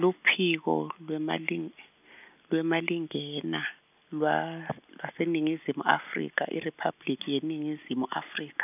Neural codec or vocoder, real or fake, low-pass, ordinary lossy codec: none; real; 3.6 kHz; none